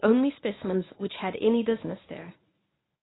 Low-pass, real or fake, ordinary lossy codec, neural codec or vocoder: 7.2 kHz; fake; AAC, 16 kbps; codec, 24 kHz, 0.9 kbps, WavTokenizer, medium speech release version 1